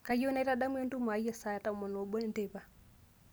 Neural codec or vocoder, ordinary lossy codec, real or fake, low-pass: none; none; real; none